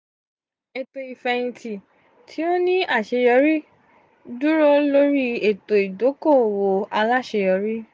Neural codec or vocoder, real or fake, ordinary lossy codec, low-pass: none; real; none; none